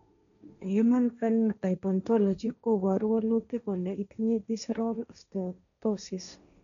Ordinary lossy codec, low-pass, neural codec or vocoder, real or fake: none; 7.2 kHz; codec, 16 kHz, 1.1 kbps, Voila-Tokenizer; fake